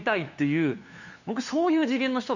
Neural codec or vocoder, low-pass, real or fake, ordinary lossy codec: codec, 16 kHz, 2 kbps, FunCodec, trained on LibriTTS, 25 frames a second; 7.2 kHz; fake; none